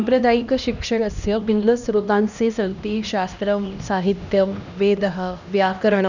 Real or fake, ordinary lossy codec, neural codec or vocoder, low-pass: fake; none; codec, 16 kHz, 1 kbps, X-Codec, HuBERT features, trained on LibriSpeech; 7.2 kHz